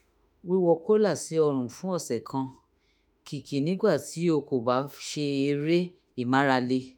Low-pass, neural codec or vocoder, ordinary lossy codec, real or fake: none; autoencoder, 48 kHz, 32 numbers a frame, DAC-VAE, trained on Japanese speech; none; fake